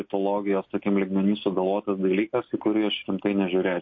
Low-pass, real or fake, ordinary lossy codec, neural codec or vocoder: 7.2 kHz; real; MP3, 32 kbps; none